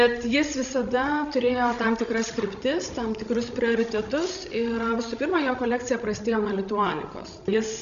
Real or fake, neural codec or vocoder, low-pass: fake; codec, 16 kHz, 16 kbps, FreqCodec, larger model; 7.2 kHz